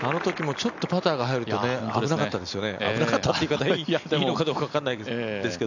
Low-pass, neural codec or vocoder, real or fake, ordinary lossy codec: 7.2 kHz; none; real; none